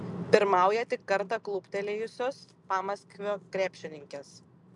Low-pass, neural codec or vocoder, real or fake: 10.8 kHz; none; real